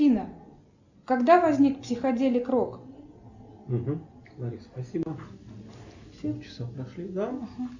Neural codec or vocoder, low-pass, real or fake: none; 7.2 kHz; real